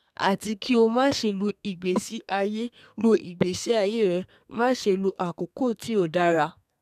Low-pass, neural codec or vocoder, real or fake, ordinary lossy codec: 14.4 kHz; codec, 32 kHz, 1.9 kbps, SNAC; fake; none